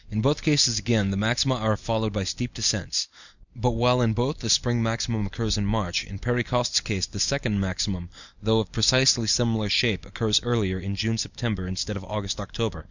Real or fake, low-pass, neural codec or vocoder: real; 7.2 kHz; none